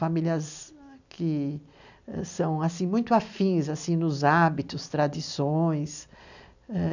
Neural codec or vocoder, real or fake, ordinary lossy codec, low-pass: none; real; none; 7.2 kHz